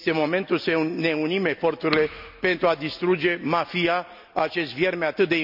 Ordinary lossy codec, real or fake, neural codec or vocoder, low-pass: none; real; none; 5.4 kHz